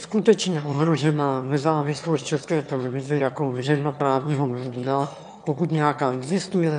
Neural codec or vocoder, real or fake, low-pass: autoencoder, 22.05 kHz, a latent of 192 numbers a frame, VITS, trained on one speaker; fake; 9.9 kHz